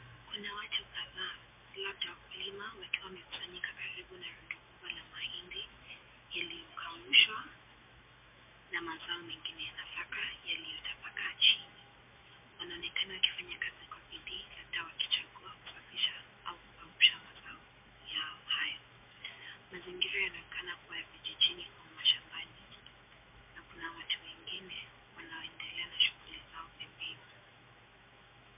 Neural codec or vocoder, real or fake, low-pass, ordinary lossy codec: autoencoder, 48 kHz, 128 numbers a frame, DAC-VAE, trained on Japanese speech; fake; 3.6 kHz; MP3, 32 kbps